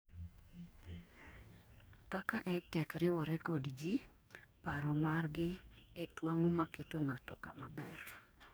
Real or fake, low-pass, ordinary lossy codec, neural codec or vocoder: fake; none; none; codec, 44.1 kHz, 2.6 kbps, DAC